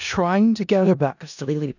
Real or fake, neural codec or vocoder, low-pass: fake; codec, 16 kHz in and 24 kHz out, 0.4 kbps, LongCat-Audio-Codec, four codebook decoder; 7.2 kHz